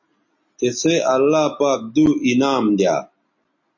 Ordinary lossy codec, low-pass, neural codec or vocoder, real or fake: MP3, 32 kbps; 7.2 kHz; none; real